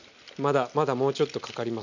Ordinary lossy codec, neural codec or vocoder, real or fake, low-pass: none; none; real; 7.2 kHz